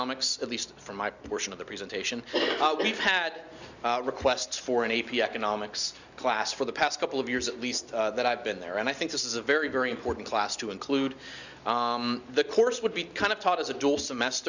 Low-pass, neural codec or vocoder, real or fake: 7.2 kHz; none; real